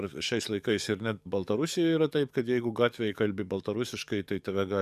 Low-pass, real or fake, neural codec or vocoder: 14.4 kHz; fake; codec, 44.1 kHz, 7.8 kbps, DAC